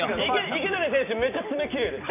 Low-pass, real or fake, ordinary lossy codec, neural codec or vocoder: 3.6 kHz; real; none; none